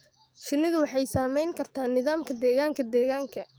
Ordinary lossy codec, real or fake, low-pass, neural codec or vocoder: none; fake; none; codec, 44.1 kHz, 7.8 kbps, DAC